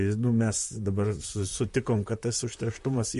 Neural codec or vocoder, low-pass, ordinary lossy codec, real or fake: vocoder, 44.1 kHz, 128 mel bands, Pupu-Vocoder; 14.4 kHz; MP3, 48 kbps; fake